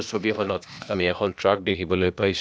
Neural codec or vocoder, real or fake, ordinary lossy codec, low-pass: codec, 16 kHz, 0.8 kbps, ZipCodec; fake; none; none